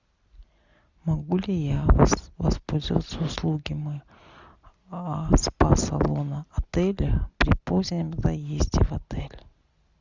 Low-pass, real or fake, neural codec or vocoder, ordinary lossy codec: 7.2 kHz; real; none; Opus, 64 kbps